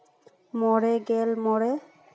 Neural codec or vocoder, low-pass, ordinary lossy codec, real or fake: none; none; none; real